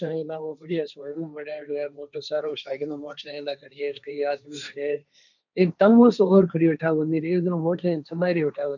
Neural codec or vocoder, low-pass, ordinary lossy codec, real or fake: codec, 16 kHz, 1.1 kbps, Voila-Tokenizer; 7.2 kHz; none; fake